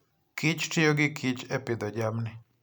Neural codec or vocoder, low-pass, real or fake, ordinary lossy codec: none; none; real; none